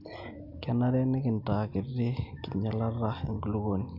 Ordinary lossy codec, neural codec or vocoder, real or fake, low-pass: Opus, 64 kbps; none; real; 5.4 kHz